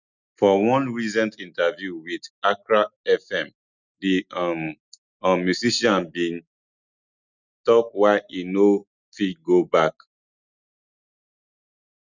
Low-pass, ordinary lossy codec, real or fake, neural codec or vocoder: 7.2 kHz; none; real; none